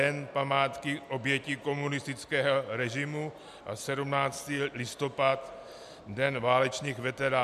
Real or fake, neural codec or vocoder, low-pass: real; none; 14.4 kHz